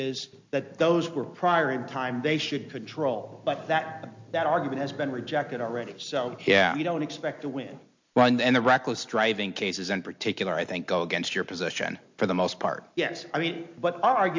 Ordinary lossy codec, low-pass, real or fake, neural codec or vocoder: MP3, 48 kbps; 7.2 kHz; real; none